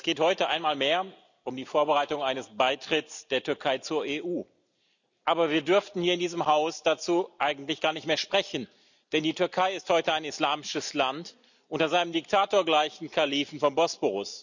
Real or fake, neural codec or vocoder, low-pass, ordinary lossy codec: real; none; 7.2 kHz; none